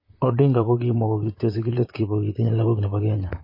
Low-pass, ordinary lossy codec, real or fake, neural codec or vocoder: 5.4 kHz; MP3, 24 kbps; fake; vocoder, 44.1 kHz, 128 mel bands, Pupu-Vocoder